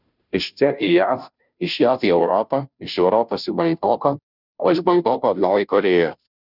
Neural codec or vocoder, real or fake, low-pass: codec, 16 kHz, 0.5 kbps, FunCodec, trained on Chinese and English, 25 frames a second; fake; 5.4 kHz